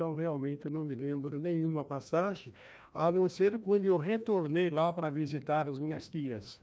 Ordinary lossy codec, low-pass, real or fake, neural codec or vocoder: none; none; fake; codec, 16 kHz, 1 kbps, FreqCodec, larger model